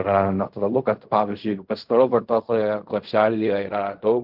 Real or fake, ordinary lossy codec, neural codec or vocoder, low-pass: fake; Opus, 32 kbps; codec, 16 kHz in and 24 kHz out, 0.4 kbps, LongCat-Audio-Codec, fine tuned four codebook decoder; 5.4 kHz